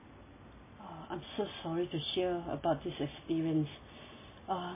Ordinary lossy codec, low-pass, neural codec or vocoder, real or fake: MP3, 16 kbps; 3.6 kHz; none; real